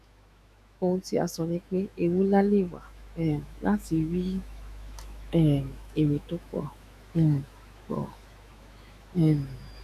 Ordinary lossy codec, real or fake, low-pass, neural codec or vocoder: none; fake; 14.4 kHz; autoencoder, 48 kHz, 128 numbers a frame, DAC-VAE, trained on Japanese speech